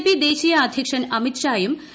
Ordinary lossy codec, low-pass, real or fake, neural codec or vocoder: none; none; real; none